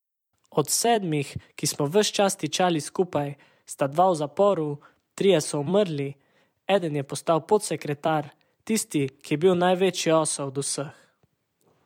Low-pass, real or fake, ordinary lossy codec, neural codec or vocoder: 19.8 kHz; fake; MP3, 96 kbps; vocoder, 44.1 kHz, 128 mel bands every 256 samples, BigVGAN v2